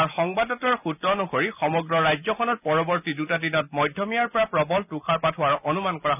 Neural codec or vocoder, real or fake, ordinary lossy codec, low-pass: none; real; none; 3.6 kHz